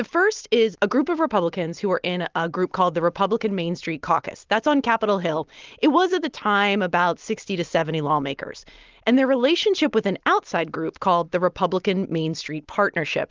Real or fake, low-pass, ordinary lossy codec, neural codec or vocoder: real; 7.2 kHz; Opus, 32 kbps; none